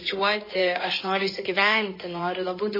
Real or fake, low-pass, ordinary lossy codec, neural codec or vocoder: fake; 5.4 kHz; MP3, 24 kbps; codec, 16 kHz in and 24 kHz out, 2.2 kbps, FireRedTTS-2 codec